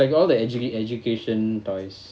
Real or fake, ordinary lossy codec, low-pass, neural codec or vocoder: real; none; none; none